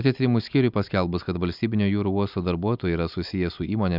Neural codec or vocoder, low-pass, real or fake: none; 5.4 kHz; real